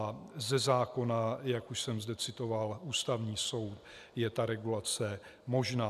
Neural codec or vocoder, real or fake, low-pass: none; real; 14.4 kHz